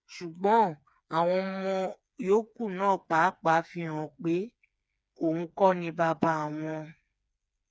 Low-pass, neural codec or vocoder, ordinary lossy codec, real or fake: none; codec, 16 kHz, 4 kbps, FreqCodec, smaller model; none; fake